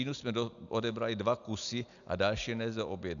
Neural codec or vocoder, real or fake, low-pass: none; real; 7.2 kHz